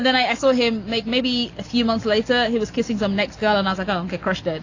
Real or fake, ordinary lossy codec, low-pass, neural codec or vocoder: real; AAC, 32 kbps; 7.2 kHz; none